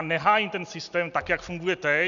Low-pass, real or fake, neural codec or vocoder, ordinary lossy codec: 7.2 kHz; real; none; MP3, 96 kbps